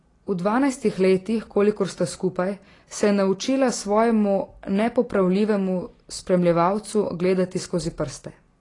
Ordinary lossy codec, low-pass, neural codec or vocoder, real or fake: AAC, 32 kbps; 10.8 kHz; none; real